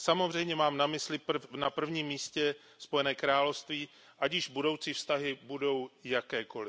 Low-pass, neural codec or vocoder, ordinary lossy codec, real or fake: none; none; none; real